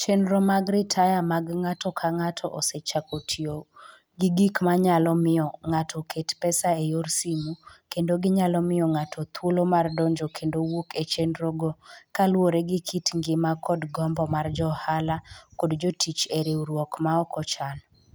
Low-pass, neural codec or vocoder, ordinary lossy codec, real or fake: none; none; none; real